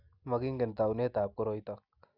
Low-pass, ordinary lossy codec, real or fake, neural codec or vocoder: 5.4 kHz; none; real; none